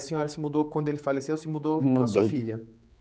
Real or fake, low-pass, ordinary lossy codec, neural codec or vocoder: fake; none; none; codec, 16 kHz, 4 kbps, X-Codec, HuBERT features, trained on general audio